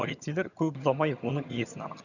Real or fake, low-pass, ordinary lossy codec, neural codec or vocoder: fake; 7.2 kHz; none; vocoder, 22.05 kHz, 80 mel bands, HiFi-GAN